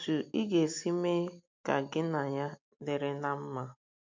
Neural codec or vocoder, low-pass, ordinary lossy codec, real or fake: none; 7.2 kHz; MP3, 48 kbps; real